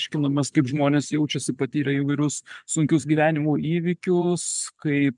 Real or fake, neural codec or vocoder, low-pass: fake; vocoder, 24 kHz, 100 mel bands, Vocos; 10.8 kHz